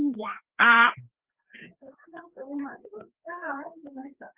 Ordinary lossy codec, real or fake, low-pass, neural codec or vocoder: Opus, 16 kbps; fake; 3.6 kHz; codec, 16 kHz, 2 kbps, FreqCodec, larger model